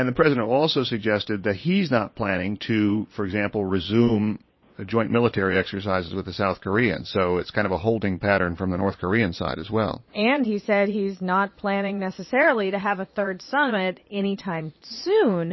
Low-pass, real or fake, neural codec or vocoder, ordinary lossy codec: 7.2 kHz; fake; vocoder, 22.05 kHz, 80 mel bands, Vocos; MP3, 24 kbps